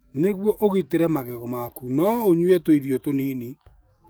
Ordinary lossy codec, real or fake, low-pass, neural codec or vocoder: none; fake; none; codec, 44.1 kHz, 7.8 kbps, DAC